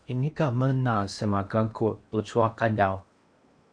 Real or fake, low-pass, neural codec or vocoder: fake; 9.9 kHz; codec, 16 kHz in and 24 kHz out, 0.8 kbps, FocalCodec, streaming, 65536 codes